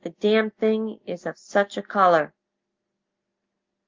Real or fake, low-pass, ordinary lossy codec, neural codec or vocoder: real; 7.2 kHz; Opus, 32 kbps; none